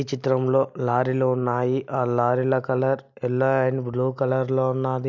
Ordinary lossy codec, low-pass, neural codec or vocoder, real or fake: MP3, 64 kbps; 7.2 kHz; none; real